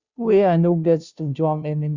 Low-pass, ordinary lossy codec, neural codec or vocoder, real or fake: 7.2 kHz; Opus, 64 kbps; codec, 16 kHz, 0.5 kbps, FunCodec, trained on Chinese and English, 25 frames a second; fake